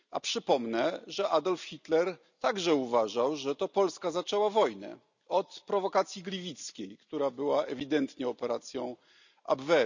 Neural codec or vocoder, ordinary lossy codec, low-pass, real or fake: none; none; 7.2 kHz; real